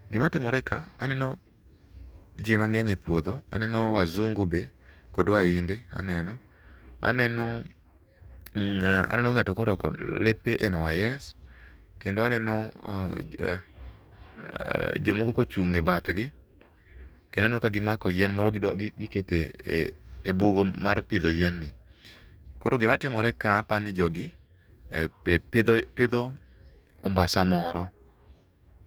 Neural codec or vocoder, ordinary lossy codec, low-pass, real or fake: codec, 44.1 kHz, 2.6 kbps, DAC; none; none; fake